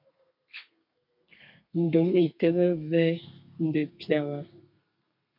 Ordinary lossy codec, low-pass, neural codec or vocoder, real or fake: MP3, 48 kbps; 5.4 kHz; codec, 32 kHz, 1.9 kbps, SNAC; fake